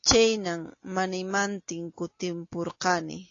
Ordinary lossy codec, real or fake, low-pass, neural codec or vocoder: AAC, 32 kbps; real; 7.2 kHz; none